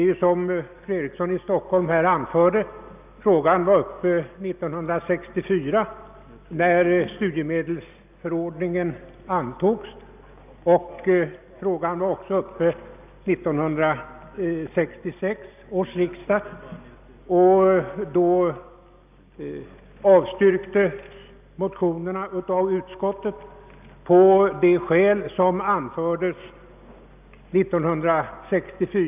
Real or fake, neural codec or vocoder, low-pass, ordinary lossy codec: real; none; 3.6 kHz; none